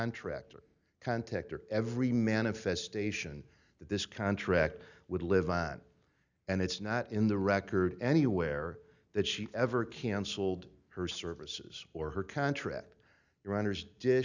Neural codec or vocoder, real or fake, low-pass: none; real; 7.2 kHz